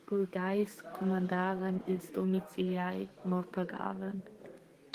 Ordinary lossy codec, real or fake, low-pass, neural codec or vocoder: Opus, 24 kbps; fake; 14.4 kHz; codec, 32 kHz, 1.9 kbps, SNAC